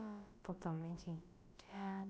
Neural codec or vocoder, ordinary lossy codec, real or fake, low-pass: codec, 16 kHz, about 1 kbps, DyCAST, with the encoder's durations; none; fake; none